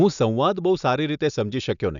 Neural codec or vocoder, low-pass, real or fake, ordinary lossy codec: none; 7.2 kHz; real; none